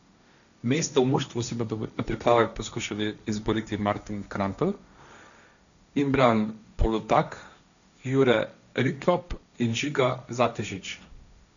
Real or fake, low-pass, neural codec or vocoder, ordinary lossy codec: fake; 7.2 kHz; codec, 16 kHz, 1.1 kbps, Voila-Tokenizer; none